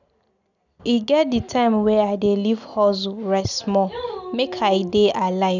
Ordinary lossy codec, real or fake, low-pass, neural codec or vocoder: none; real; 7.2 kHz; none